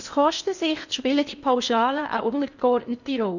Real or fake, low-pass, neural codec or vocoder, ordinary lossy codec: fake; 7.2 kHz; codec, 16 kHz in and 24 kHz out, 0.8 kbps, FocalCodec, streaming, 65536 codes; none